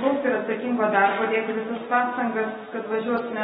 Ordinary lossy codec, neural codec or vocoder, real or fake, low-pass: AAC, 16 kbps; none; real; 14.4 kHz